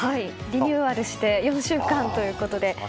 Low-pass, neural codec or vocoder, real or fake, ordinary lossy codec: none; none; real; none